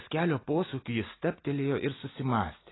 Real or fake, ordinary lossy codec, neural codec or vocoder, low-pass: real; AAC, 16 kbps; none; 7.2 kHz